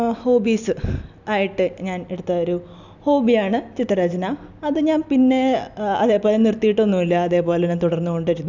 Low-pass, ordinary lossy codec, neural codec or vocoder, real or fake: 7.2 kHz; none; none; real